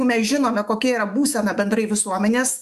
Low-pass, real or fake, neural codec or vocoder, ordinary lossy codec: 14.4 kHz; fake; autoencoder, 48 kHz, 128 numbers a frame, DAC-VAE, trained on Japanese speech; MP3, 96 kbps